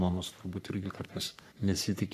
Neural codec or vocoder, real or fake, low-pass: codec, 44.1 kHz, 3.4 kbps, Pupu-Codec; fake; 14.4 kHz